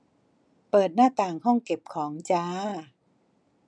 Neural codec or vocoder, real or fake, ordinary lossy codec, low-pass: none; real; none; 9.9 kHz